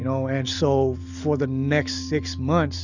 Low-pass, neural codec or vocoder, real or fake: 7.2 kHz; none; real